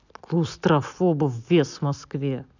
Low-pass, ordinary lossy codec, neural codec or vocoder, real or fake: 7.2 kHz; none; none; real